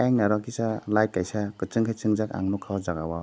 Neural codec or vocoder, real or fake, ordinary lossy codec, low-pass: none; real; none; none